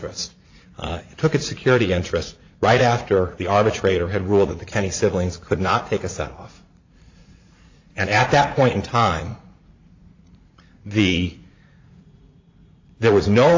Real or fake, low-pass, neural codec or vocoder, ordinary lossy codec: fake; 7.2 kHz; vocoder, 44.1 kHz, 80 mel bands, Vocos; AAC, 48 kbps